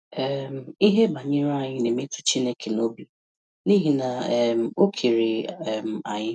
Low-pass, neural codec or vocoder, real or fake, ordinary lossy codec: 10.8 kHz; none; real; none